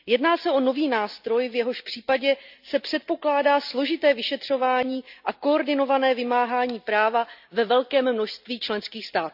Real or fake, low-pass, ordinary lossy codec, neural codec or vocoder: real; 5.4 kHz; none; none